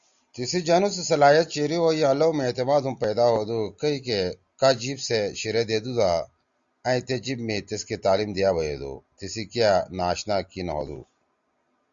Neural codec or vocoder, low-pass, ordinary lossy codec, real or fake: none; 7.2 kHz; Opus, 64 kbps; real